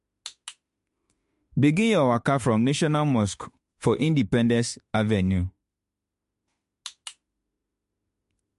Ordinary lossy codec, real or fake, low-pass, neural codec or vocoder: MP3, 48 kbps; fake; 14.4 kHz; autoencoder, 48 kHz, 32 numbers a frame, DAC-VAE, trained on Japanese speech